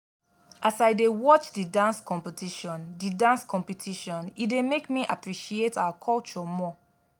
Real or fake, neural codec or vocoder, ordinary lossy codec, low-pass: real; none; none; none